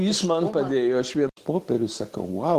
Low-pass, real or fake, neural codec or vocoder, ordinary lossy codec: 14.4 kHz; real; none; Opus, 16 kbps